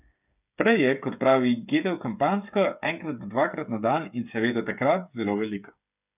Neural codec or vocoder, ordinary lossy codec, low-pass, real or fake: codec, 16 kHz, 8 kbps, FreqCodec, smaller model; none; 3.6 kHz; fake